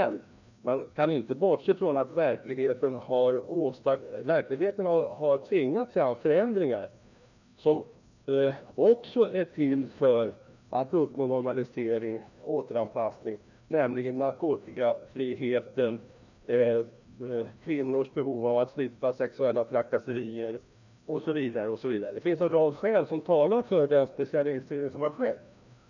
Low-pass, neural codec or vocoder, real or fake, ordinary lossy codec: 7.2 kHz; codec, 16 kHz, 1 kbps, FreqCodec, larger model; fake; none